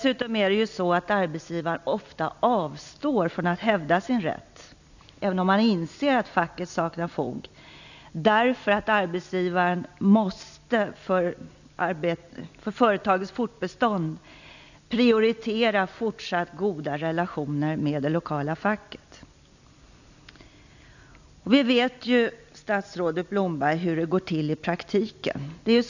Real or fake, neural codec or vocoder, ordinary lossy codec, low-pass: real; none; none; 7.2 kHz